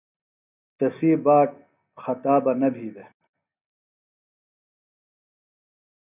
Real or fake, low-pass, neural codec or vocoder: real; 3.6 kHz; none